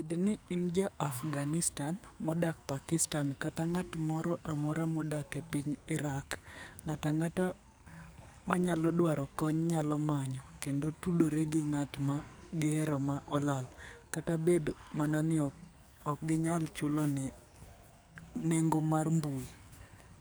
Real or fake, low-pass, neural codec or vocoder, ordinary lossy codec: fake; none; codec, 44.1 kHz, 2.6 kbps, SNAC; none